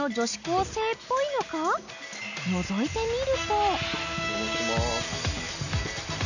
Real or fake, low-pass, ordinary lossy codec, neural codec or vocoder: real; 7.2 kHz; none; none